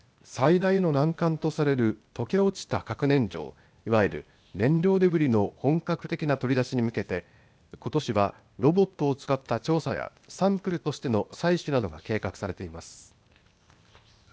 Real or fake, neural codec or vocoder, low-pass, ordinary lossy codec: fake; codec, 16 kHz, 0.8 kbps, ZipCodec; none; none